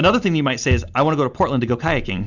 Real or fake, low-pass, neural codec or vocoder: real; 7.2 kHz; none